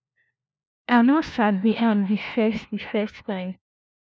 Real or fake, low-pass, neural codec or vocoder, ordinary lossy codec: fake; none; codec, 16 kHz, 1 kbps, FunCodec, trained on LibriTTS, 50 frames a second; none